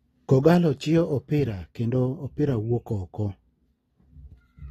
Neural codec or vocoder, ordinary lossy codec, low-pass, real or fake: none; AAC, 32 kbps; 19.8 kHz; real